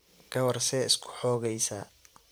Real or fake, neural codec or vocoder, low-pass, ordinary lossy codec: fake; vocoder, 44.1 kHz, 128 mel bands every 512 samples, BigVGAN v2; none; none